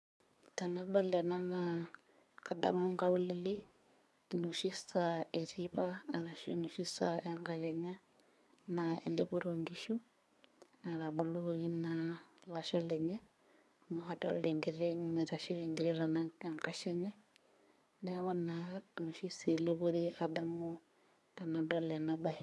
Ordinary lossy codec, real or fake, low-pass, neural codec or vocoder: none; fake; none; codec, 24 kHz, 1 kbps, SNAC